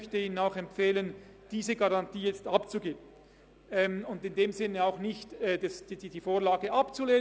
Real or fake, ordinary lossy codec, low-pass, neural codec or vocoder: real; none; none; none